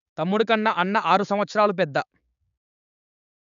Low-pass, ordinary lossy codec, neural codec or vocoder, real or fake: 7.2 kHz; none; codec, 16 kHz, 6 kbps, DAC; fake